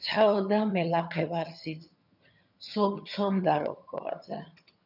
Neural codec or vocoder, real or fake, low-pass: vocoder, 22.05 kHz, 80 mel bands, HiFi-GAN; fake; 5.4 kHz